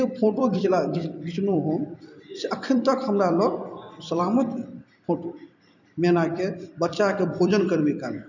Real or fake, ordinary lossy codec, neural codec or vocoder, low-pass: fake; none; vocoder, 44.1 kHz, 128 mel bands every 256 samples, BigVGAN v2; 7.2 kHz